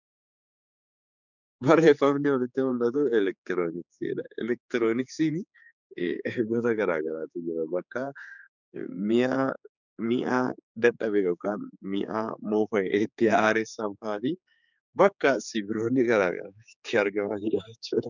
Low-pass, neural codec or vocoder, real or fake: 7.2 kHz; codec, 16 kHz, 4 kbps, X-Codec, HuBERT features, trained on general audio; fake